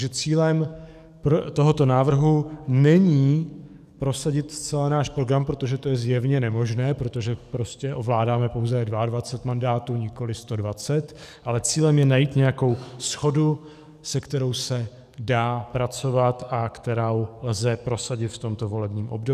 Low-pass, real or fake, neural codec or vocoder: 14.4 kHz; fake; codec, 44.1 kHz, 7.8 kbps, DAC